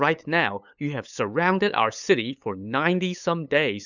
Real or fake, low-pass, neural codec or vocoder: fake; 7.2 kHz; codec, 16 kHz, 8 kbps, FunCodec, trained on LibriTTS, 25 frames a second